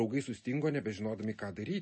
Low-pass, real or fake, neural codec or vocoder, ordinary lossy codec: 10.8 kHz; real; none; MP3, 32 kbps